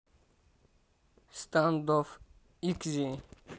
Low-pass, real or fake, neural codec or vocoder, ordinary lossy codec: none; real; none; none